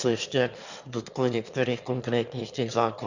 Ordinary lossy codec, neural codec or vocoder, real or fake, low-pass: Opus, 64 kbps; autoencoder, 22.05 kHz, a latent of 192 numbers a frame, VITS, trained on one speaker; fake; 7.2 kHz